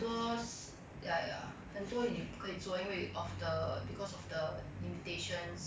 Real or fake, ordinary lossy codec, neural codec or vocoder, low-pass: real; none; none; none